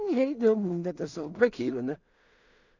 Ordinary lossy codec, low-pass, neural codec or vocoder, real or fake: none; 7.2 kHz; codec, 16 kHz in and 24 kHz out, 0.4 kbps, LongCat-Audio-Codec, two codebook decoder; fake